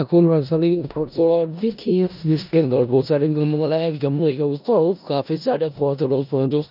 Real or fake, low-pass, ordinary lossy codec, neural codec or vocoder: fake; 5.4 kHz; none; codec, 16 kHz in and 24 kHz out, 0.4 kbps, LongCat-Audio-Codec, four codebook decoder